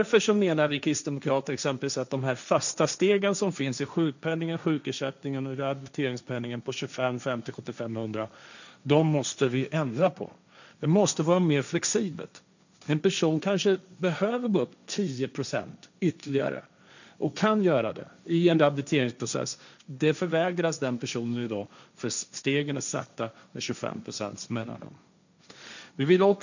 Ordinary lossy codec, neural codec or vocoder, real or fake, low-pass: none; codec, 16 kHz, 1.1 kbps, Voila-Tokenizer; fake; 7.2 kHz